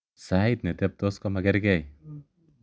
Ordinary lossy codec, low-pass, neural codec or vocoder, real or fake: none; none; none; real